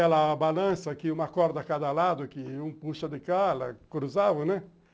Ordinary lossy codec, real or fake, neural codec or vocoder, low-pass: none; real; none; none